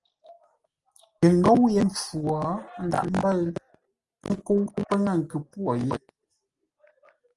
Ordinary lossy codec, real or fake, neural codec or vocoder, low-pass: Opus, 24 kbps; real; none; 10.8 kHz